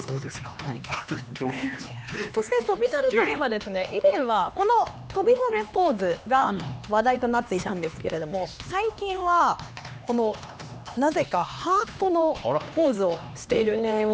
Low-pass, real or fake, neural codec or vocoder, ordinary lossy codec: none; fake; codec, 16 kHz, 2 kbps, X-Codec, HuBERT features, trained on LibriSpeech; none